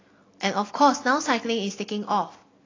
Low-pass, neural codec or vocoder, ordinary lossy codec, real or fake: 7.2 kHz; none; AAC, 32 kbps; real